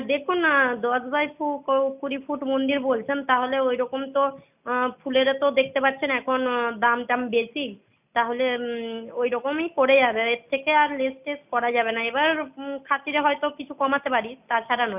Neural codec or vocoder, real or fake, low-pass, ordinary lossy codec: none; real; 3.6 kHz; none